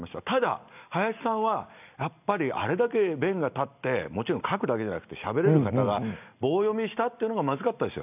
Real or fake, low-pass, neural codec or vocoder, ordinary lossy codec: real; 3.6 kHz; none; none